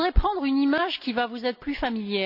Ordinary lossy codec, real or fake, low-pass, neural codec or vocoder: AAC, 48 kbps; real; 5.4 kHz; none